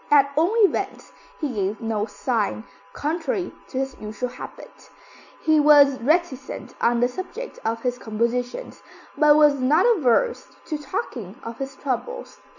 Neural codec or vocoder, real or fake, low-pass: none; real; 7.2 kHz